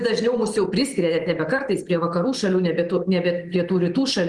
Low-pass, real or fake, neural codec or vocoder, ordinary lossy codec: 10.8 kHz; real; none; Opus, 24 kbps